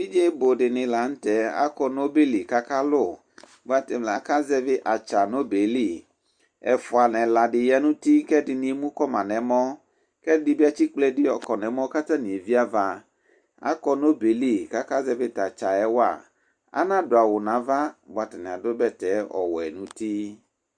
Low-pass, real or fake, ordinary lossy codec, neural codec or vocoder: 9.9 kHz; real; Opus, 64 kbps; none